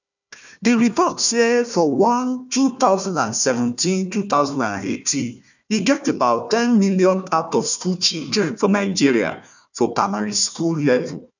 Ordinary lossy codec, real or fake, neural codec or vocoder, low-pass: none; fake; codec, 16 kHz, 1 kbps, FunCodec, trained on Chinese and English, 50 frames a second; 7.2 kHz